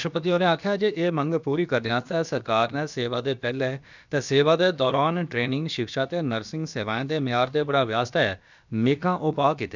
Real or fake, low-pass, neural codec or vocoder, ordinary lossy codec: fake; 7.2 kHz; codec, 16 kHz, about 1 kbps, DyCAST, with the encoder's durations; none